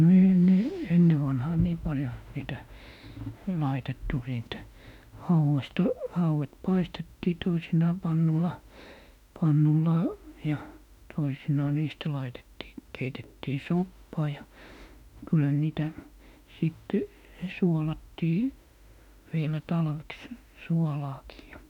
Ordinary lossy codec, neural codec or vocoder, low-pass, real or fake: none; autoencoder, 48 kHz, 32 numbers a frame, DAC-VAE, trained on Japanese speech; 19.8 kHz; fake